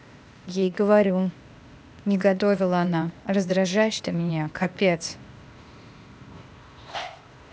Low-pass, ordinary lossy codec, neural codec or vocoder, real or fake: none; none; codec, 16 kHz, 0.8 kbps, ZipCodec; fake